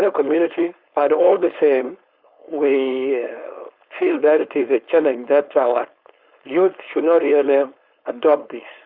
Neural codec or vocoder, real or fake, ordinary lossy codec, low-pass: codec, 16 kHz, 4.8 kbps, FACodec; fake; Opus, 64 kbps; 5.4 kHz